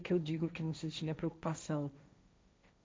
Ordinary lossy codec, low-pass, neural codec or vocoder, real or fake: none; none; codec, 16 kHz, 1.1 kbps, Voila-Tokenizer; fake